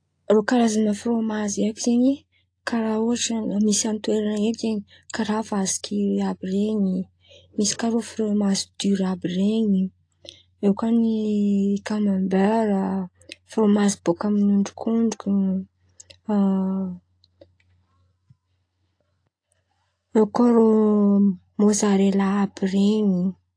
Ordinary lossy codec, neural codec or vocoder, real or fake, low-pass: AAC, 48 kbps; none; real; 9.9 kHz